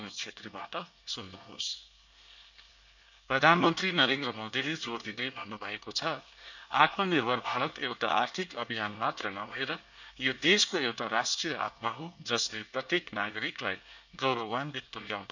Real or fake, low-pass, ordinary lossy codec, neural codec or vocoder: fake; 7.2 kHz; none; codec, 24 kHz, 1 kbps, SNAC